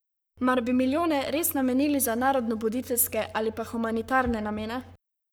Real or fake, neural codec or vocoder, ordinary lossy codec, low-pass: fake; codec, 44.1 kHz, 7.8 kbps, Pupu-Codec; none; none